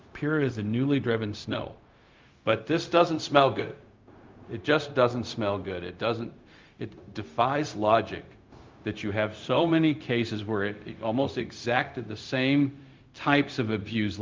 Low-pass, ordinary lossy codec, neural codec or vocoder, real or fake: 7.2 kHz; Opus, 32 kbps; codec, 16 kHz, 0.4 kbps, LongCat-Audio-Codec; fake